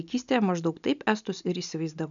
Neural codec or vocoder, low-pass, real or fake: none; 7.2 kHz; real